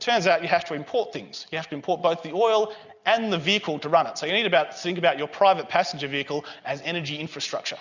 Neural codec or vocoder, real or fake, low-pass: none; real; 7.2 kHz